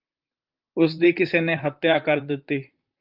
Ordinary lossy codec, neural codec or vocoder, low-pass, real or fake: Opus, 32 kbps; vocoder, 44.1 kHz, 128 mel bands, Pupu-Vocoder; 5.4 kHz; fake